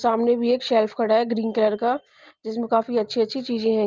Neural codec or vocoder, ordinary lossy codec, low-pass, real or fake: none; Opus, 24 kbps; 7.2 kHz; real